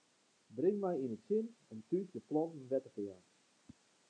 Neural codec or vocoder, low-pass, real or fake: none; 9.9 kHz; real